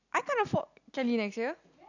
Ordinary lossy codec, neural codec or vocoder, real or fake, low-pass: none; none; real; 7.2 kHz